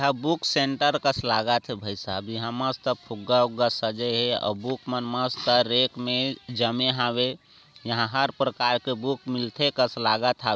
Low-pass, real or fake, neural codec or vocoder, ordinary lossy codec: none; real; none; none